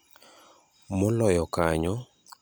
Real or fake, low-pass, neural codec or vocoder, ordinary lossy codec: real; none; none; none